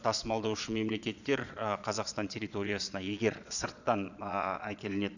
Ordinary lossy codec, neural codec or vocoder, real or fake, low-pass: none; vocoder, 22.05 kHz, 80 mel bands, Vocos; fake; 7.2 kHz